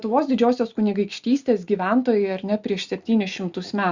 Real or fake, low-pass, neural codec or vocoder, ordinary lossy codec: real; 7.2 kHz; none; Opus, 64 kbps